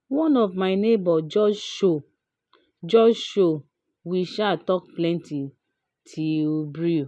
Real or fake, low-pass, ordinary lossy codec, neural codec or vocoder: real; none; none; none